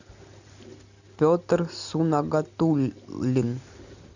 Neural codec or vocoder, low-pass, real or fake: none; 7.2 kHz; real